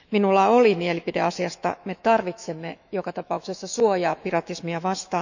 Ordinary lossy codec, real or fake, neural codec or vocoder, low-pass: none; fake; autoencoder, 48 kHz, 128 numbers a frame, DAC-VAE, trained on Japanese speech; 7.2 kHz